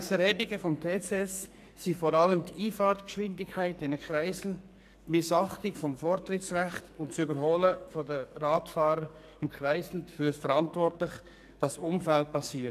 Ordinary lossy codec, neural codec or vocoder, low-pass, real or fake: MP3, 96 kbps; codec, 44.1 kHz, 2.6 kbps, SNAC; 14.4 kHz; fake